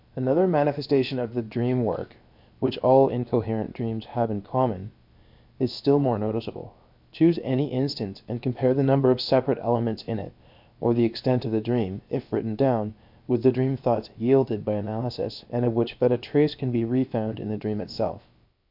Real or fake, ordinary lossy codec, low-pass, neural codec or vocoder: fake; MP3, 48 kbps; 5.4 kHz; codec, 16 kHz, about 1 kbps, DyCAST, with the encoder's durations